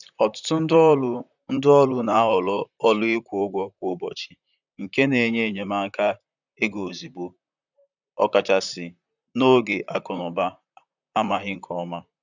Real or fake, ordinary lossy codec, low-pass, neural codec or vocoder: fake; none; 7.2 kHz; vocoder, 44.1 kHz, 128 mel bands, Pupu-Vocoder